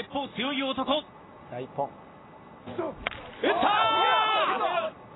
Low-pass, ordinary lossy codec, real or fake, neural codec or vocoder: 7.2 kHz; AAC, 16 kbps; real; none